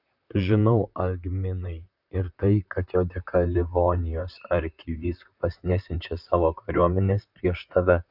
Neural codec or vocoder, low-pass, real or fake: vocoder, 44.1 kHz, 128 mel bands, Pupu-Vocoder; 5.4 kHz; fake